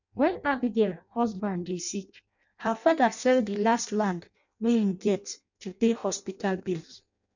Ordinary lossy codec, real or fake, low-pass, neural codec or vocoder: none; fake; 7.2 kHz; codec, 16 kHz in and 24 kHz out, 0.6 kbps, FireRedTTS-2 codec